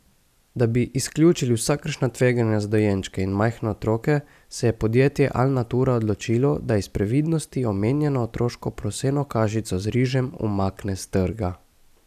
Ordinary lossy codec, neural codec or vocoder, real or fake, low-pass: none; none; real; 14.4 kHz